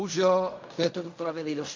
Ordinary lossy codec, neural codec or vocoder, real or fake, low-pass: MP3, 48 kbps; codec, 16 kHz in and 24 kHz out, 0.4 kbps, LongCat-Audio-Codec, fine tuned four codebook decoder; fake; 7.2 kHz